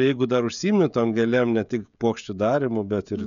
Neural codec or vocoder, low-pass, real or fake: codec, 16 kHz, 16 kbps, FreqCodec, smaller model; 7.2 kHz; fake